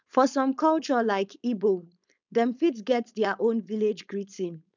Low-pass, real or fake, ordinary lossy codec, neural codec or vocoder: 7.2 kHz; fake; none; codec, 16 kHz, 4.8 kbps, FACodec